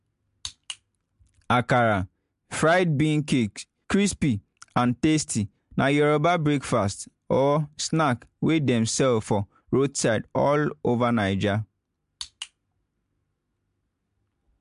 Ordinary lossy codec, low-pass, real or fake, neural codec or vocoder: MP3, 64 kbps; 10.8 kHz; real; none